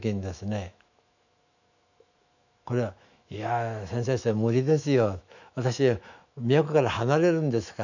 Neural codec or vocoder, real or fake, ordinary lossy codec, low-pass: autoencoder, 48 kHz, 128 numbers a frame, DAC-VAE, trained on Japanese speech; fake; none; 7.2 kHz